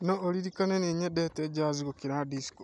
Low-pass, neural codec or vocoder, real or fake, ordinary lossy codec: 10.8 kHz; none; real; none